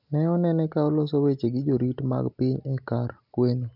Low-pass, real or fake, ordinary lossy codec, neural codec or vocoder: 5.4 kHz; real; none; none